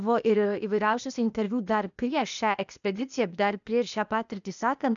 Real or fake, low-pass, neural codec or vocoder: fake; 7.2 kHz; codec, 16 kHz, 0.8 kbps, ZipCodec